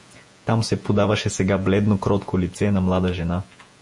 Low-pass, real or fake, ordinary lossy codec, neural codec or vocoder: 10.8 kHz; fake; MP3, 48 kbps; vocoder, 48 kHz, 128 mel bands, Vocos